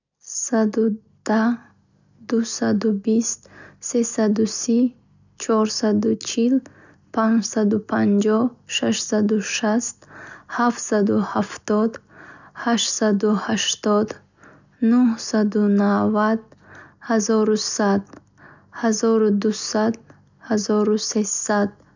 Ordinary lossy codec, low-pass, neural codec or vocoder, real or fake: none; 7.2 kHz; none; real